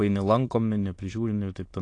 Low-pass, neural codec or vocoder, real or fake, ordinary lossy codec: 9.9 kHz; autoencoder, 22.05 kHz, a latent of 192 numbers a frame, VITS, trained on many speakers; fake; AAC, 48 kbps